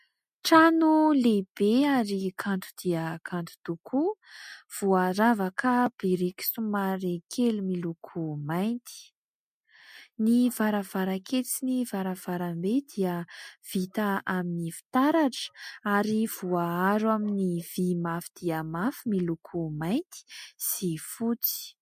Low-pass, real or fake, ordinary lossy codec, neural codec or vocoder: 14.4 kHz; real; MP3, 64 kbps; none